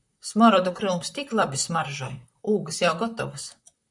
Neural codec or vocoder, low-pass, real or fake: vocoder, 44.1 kHz, 128 mel bands, Pupu-Vocoder; 10.8 kHz; fake